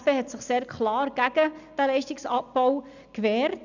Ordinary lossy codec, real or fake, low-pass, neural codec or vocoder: none; real; 7.2 kHz; none